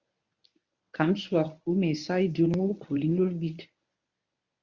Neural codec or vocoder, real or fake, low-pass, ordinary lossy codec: codec, 24 kHz, 0.9 kbps, WavTokenizer, medium speech release version 1; fake; 7.2 kHz; Opus, 64 kbps